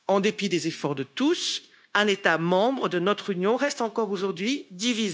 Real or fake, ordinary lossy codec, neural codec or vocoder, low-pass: fake; none; codec, 16 kHz, 0.9 kbps, LongCat-Audio-Codec; none